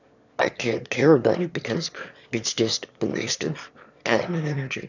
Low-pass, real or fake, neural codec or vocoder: 7.2 kHz; fake; autoencoder, 22.05 kHz, a latent of 192 numbers a frame, VITS, trained on one speaker